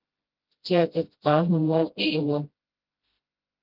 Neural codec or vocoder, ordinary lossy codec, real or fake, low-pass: codec, 16 kHz, 0.5 kbps, FreqCodec, smaller model; Opus, 24 kbps; fake; 5.4 kHz